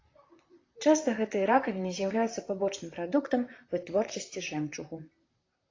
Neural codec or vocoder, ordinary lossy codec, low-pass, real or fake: vocoder, 44.1 kHz, 128 mel bands, Pupu-Vocoder; AAC, 32 kbps; 7.2 kHz; fake